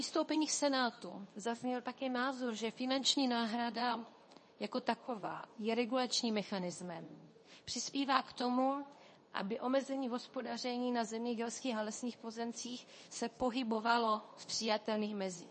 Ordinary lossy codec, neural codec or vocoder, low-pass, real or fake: MP3, 32 kbps; codec, 24 kHz, 0.9 kbps, WavTokenizer, medium speech release version 2; 10.8 kHz; fake